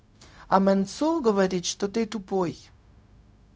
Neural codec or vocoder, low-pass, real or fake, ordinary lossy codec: codec, 16 kHz, 0.4 kbps, LongCat-Audio-Codec; none; fake; none